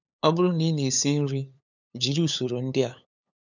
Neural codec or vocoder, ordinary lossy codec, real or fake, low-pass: codec, 16 kHz, 8 kbps, FunCodec, trained on LibriTTS, 25 frames a second; none; fake; 7.2 kHz